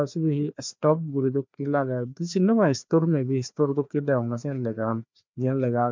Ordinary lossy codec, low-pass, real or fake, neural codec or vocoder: MP3, 64 kbps; 7.2 kHz; fake; codec, 16 kHz, 2 kbps, FreqCodec, larger model